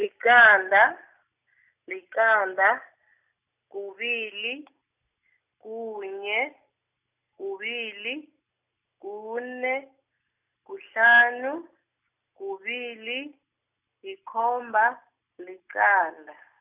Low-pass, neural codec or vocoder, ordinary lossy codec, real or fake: 3.6 kHz; none; none; real